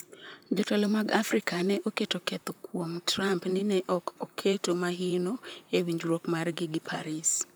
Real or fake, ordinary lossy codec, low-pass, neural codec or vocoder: fake; none; none; vocoder, 44.1 kHz, 128 mel bands, Pupu-Vocoder